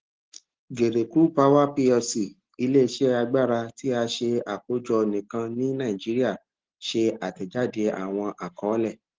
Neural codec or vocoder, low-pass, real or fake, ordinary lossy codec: none; 7.2 kHz; real; Opus, 16 kbps